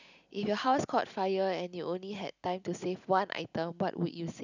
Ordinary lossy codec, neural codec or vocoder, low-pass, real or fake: none; none; 7.2 kHz; real